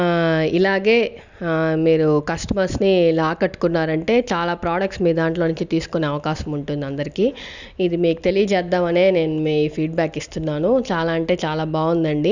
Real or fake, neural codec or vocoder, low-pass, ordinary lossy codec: real; none; 7.2 kHz; none